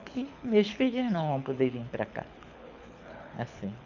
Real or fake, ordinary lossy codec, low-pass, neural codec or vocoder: fake; none; 7.2 kHz; codec, 24 kHz, 6 kbps, HILCodec